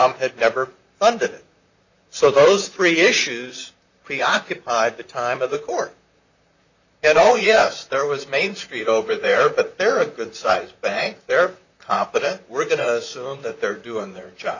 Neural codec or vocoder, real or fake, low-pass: vocoder, 44.1 kHz, 128 mel bands, Pupu-Vocoder; fake; 7.2 kHz